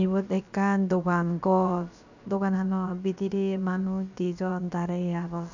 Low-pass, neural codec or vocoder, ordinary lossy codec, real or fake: 7.2 kHz; codec, 16 kHz, about 1 kbps, DyCAST, with the encoder's durations; none; fake